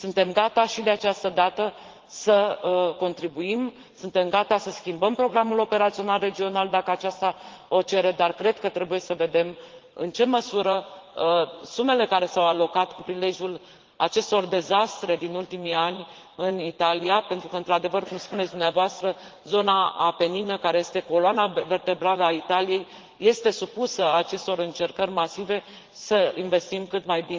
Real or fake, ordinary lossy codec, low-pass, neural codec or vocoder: fake; Opus, 16 kbps; 7.2 kHz; vocoder, 44.1 kHz, 80 mel bands, Vocos